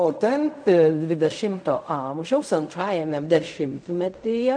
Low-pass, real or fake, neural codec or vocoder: 9.9 kHz; fake; codec, 16 kHz in and 24 kHz out, 0.4 kbps, LongCat-Audio-Codec, fine tuned four codebook decoder